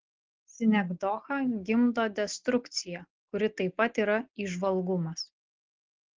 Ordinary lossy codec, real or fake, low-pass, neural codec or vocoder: Opus, 16 kbps; real; 7.2 kHz; none